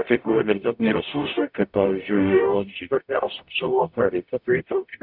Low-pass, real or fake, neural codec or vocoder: 5.4 kHz; fake; codec, 44.1 kHz, 0.9 kbps, DAC